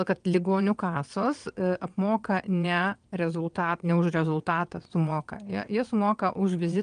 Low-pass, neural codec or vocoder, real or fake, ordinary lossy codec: 9.9 kHz; vocoder, 22.05 kHz, 80 mel bands, WaveNeXt; fake; Opus, 32 kbps